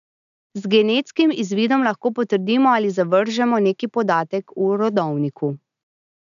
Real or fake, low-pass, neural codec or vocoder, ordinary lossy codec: real; 7.2 kHz; none; none